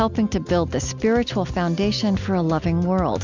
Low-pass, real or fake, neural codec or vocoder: 7.2 kHz; real; none